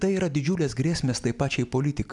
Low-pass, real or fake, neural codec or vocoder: 10.8 kHz; real; none